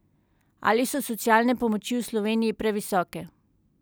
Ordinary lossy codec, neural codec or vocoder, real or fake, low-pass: none; none; real; none